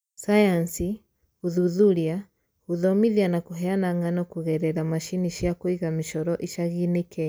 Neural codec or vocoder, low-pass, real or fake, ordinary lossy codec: none; none; real; none